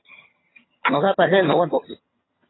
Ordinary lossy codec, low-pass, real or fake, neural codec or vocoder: AAC, 16 kbps; 7.2 kHz; fake; vocoder, 22.05 kHz, 80 mel bands, HiFi-GAN